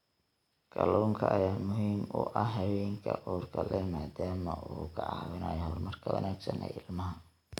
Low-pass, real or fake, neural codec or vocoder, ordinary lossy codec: 19.8 kHz; real; none; none